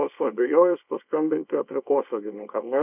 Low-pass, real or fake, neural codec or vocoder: 3.6 kHz; fake; codec, 24 kHz, 0.9 kbps, WavTokenizer, small release